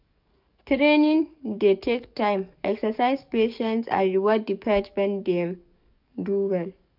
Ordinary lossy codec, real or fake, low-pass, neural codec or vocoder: none; fake; 5.4 kHz; codec, 44.1 kHz, 7.8 kbps, DAC